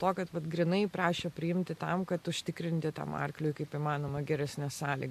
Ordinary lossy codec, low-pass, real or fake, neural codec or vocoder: MP3, 64 kbps; 14.4 kHz; real; none